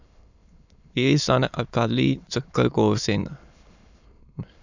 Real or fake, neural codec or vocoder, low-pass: fake; autoencoder, 22.05 kHz, a latent of 192 numbers a frame, VITS, trained on many speakers; 7.2 kHz